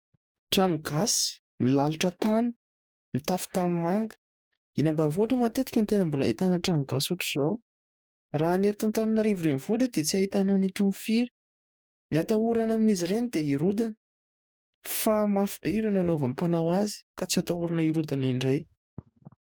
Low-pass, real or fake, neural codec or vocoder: 19.8 kHz; fake; codec, 44.1 kHz, 2.6 kbps, DAC